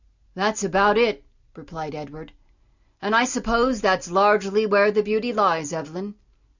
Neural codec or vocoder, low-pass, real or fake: none; 7.2 kHz; real